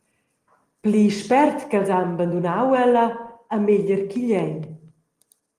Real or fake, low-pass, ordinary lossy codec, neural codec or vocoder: real; 14.4 kHz; Opus, 24 kbps; none